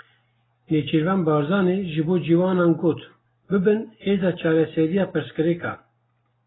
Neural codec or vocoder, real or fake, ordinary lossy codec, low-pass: none; real; AAC, 16 kbps; 7.2 kHz